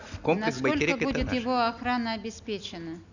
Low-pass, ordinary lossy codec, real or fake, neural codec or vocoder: 7.2 kHz; none; real; none